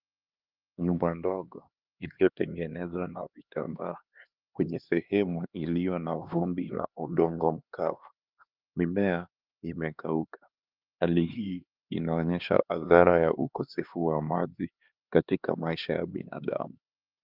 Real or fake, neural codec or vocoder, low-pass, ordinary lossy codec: fake; codec, 16 kHz, 2 kbps, X-Codec, HuBERT features, trained on LibriSpeech; 5.4 kHz; Opus, 24 kbps